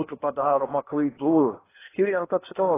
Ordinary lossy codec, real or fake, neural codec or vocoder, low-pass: AAC, 16 kbps; fake; codec, 16 kHz in and 24 kHz out, 0.8 kbps, FocalCodec, streaming, 65536 codes; 3.6 kHz